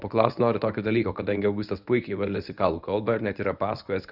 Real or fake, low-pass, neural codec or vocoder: fake; 5.4 kHz; codec, 24 kHz, 0.9 kbps, WavTokenizer, medium speech release version 1